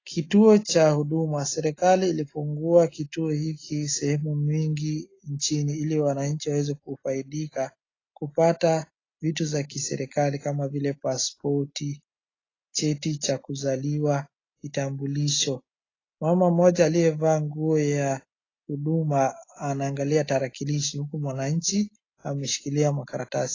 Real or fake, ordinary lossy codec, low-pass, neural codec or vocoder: real; AAC, 32 kbps; 7.2 kHz; none